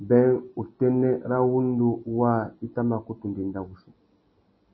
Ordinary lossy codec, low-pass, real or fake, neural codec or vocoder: MP3, 24 kbps; 7.2 kHz; real; none